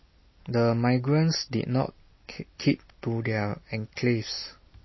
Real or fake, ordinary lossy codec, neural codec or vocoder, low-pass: real; MP3, 24 kbps; none; 7.2 kHz